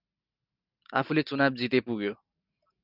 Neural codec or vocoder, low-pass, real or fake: none; 5.4 kHz; real